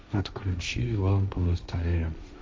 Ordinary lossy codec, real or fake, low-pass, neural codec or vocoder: none; fake; none; codec, 16 kHz, 1.1 kbps, Voila-Tokenizer